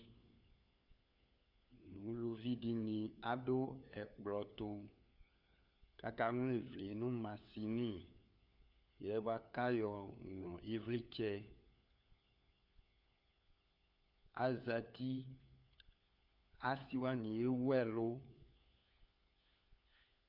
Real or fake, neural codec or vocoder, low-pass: fake; codec, 16 kHz, 4 kbps, FunCodec, trained on LibriTTS, 50 frames a second; 5.4 kHz